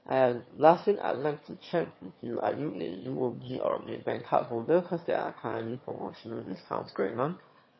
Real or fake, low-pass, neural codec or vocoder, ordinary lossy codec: fake; 7.2 kHz; autoencoder, 22.05 kHz, a latent of 192 numbers a frame, VITS, trained on one speaker; MP3, 24 kbps